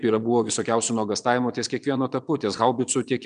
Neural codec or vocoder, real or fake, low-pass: vocoder, 22.05 kHz, 80 mel bands, Vocos; fake; 9.9 kHz